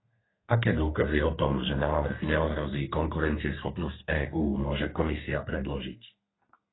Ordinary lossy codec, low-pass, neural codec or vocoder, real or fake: AAC, 16 kbps; 7.2 kHz; codec, 32 kHz, 1.9 kbps, SNAC; fake